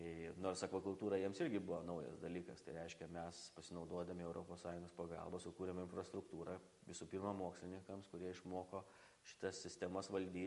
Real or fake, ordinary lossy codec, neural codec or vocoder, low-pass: real; MP3, 48 kbps; none; 14.4 kHz